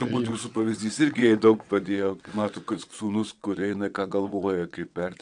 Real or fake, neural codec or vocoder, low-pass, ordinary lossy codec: fake; vocoder, 22.05 kHz, 80 mel bands, WaveNeXt; 9.9 kHz; MP3, 96 kbps